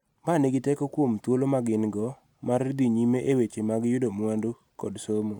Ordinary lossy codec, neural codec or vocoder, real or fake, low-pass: none; none; real; 19.8 kHz